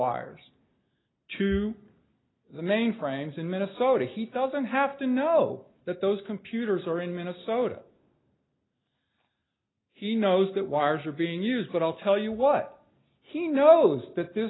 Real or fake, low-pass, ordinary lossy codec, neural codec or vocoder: real; 7.2 kHz; AAC, 16 kbps; none